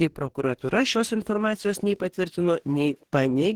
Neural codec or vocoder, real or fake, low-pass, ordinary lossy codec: codec, 44.1 kHz, 2.6 kbps, DAC; fake; 19.8 kHz; Opus, 16 kbps